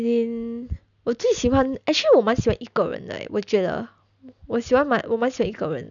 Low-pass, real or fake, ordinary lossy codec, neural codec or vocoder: 7.2 kHz; real; none; none